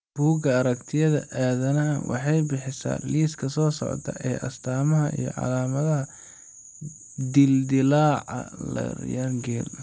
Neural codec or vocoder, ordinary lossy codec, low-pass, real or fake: none; none; none; real